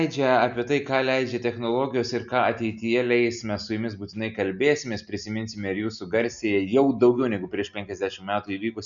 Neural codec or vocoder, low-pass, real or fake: none; 7.2 kHz; real